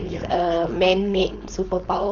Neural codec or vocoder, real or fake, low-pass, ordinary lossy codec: codec, 16 kHz, 4.8 kbps, FACodec; fake; 7.2 kHz; none